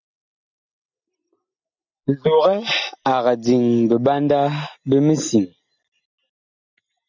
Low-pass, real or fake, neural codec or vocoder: 7.2 kHz; real; none